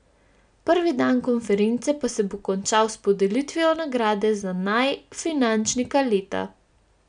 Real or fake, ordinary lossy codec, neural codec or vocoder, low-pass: real; none; none; 9.9 kHz